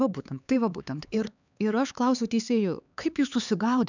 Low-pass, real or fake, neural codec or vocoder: 7.2 kHz; fake; codec, 16 kHz, 4 kbps, X-Codec, HuBERT features, trained on LibriSpeech